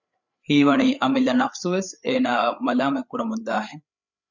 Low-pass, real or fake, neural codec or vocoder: 7.2 kHz; fake; codec, 16 kHz, 8 kbps, FreqCodec, larger model